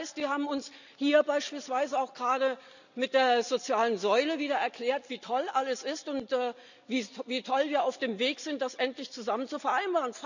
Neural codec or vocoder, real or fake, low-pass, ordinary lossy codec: none; real; 7.2 kHz; none